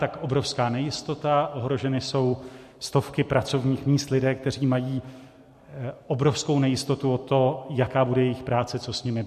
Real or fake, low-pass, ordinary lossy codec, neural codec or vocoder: real; 14.4 kHz; MP3, 64 kbps; none